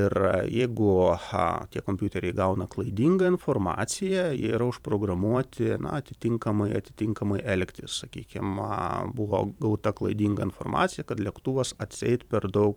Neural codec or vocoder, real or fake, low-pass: vocoder, 44.1 kHz, 128 mel bands every 512 samples, BigVGAN v2; fake; 19.8 kHz